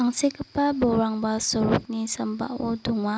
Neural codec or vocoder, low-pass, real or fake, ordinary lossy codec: none; none; real; none